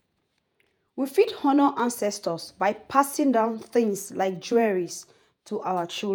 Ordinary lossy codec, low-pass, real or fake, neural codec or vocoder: none; none; fake; vocoder, 48 kHz, 128 mel bands, Vocos